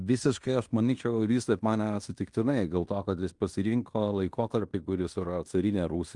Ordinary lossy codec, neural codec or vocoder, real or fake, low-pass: Opus, 32 kbps; codec, 16 kHz in and 24 kHz out, 0.9 kbps, LongCat-Audio-Codec, fine tuned four codebook decoder; fake; 10.8 kHz